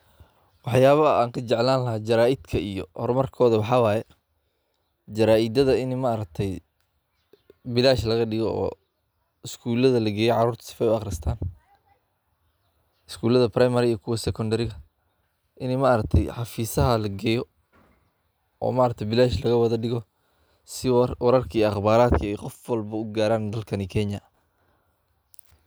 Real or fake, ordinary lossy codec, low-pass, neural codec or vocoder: real; none; none; none